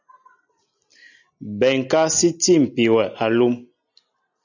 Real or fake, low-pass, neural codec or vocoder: real; 7.2 kHz; none